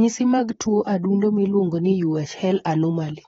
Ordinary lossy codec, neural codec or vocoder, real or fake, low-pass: AAC, 24 kbps; vocoder, 44.1 kHz, 128 mel bands, Pupu-Vocoder; fake; 19.8 kHz